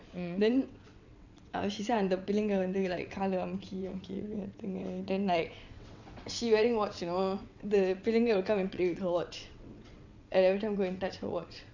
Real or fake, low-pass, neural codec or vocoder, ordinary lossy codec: fake; 7.2 kHz; vocoder, 22.05 kHz, 80 mel bands, WaveNeXt; none